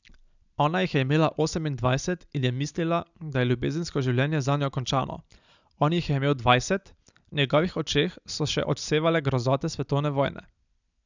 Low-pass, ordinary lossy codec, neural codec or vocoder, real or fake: 7.2 kHz; none; none; real